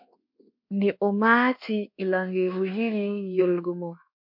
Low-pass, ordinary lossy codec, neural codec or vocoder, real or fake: 5.4 kHz; MP3, 48 kbps; codec, 24 kHz, 1.2 kbps, DualCodec; fake